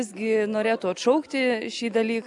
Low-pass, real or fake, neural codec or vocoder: 10.8 kHz; real; none